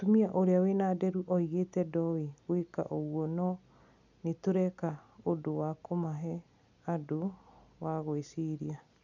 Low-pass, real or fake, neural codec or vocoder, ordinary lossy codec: 7.2 kHz; real; none; none